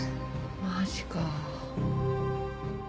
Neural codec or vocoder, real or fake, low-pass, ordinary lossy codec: none; real; none; none